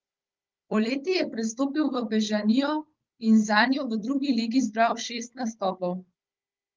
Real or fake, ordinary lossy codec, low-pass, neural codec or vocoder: fake; Opus, 24 kbps; 7.2 kHz; codec, 16 kHz, 16 kbps, FunCodec, trained on Chinese and English, 50 frames a second